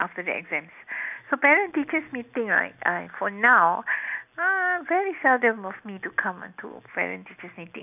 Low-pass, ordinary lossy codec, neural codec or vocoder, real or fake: 3.6 kHz; none; none; real